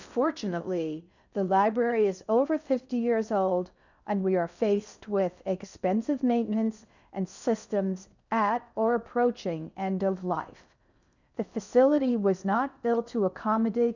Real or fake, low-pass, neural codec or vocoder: fake; 7.2 kHz; codec, 16 kHz in and 24 kHz out, 0.6 kbps, FocalCodec, streaming, 2048 codes